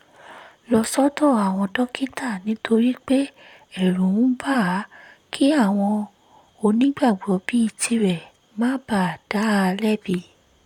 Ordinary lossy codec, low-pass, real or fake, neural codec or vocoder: none; 19.8 kHz; fake; vocoder, 44.1 kHz, 128 mel bands, Pupu-Vocoder